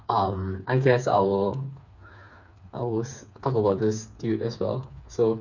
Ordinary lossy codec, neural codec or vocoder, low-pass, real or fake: none; codec, 16 kHz, 4 kbps, FreqCodec, smaller model; 7.2 kHz; fake